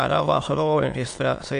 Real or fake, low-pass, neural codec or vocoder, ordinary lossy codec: fake; 9.9 kHz; autoencoder, 22.05 kHz, a latent of 192 numbers a frame, VITS, trained on many speakers; MP3, 64 kbps